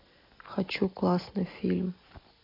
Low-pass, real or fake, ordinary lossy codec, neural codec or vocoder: 5.4 kHz; real; AAC, 24 kbps; none